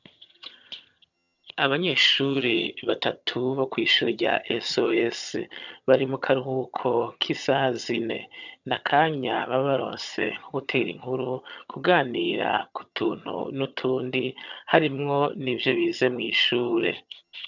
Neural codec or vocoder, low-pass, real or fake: vocoder, 22.05 kHz, 80 mel bands, HiFi-GAN; 7.2 kHz; fake